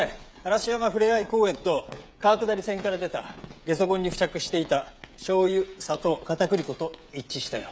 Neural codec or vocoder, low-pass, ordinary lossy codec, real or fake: codec, 16 kHz, 16 kbps, FreqCodec, smaller model; none; none; fake